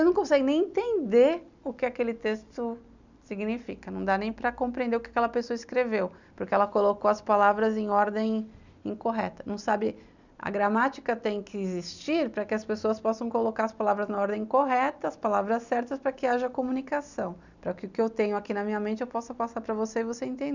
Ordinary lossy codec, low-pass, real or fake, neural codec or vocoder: none; 7.2 kHz; real; none